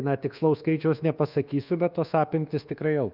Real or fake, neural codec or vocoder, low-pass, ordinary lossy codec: fake; codec, 24 kHz, 1.2 kbps, DualCodec; 5.4 kHz; Opus, 24 kbps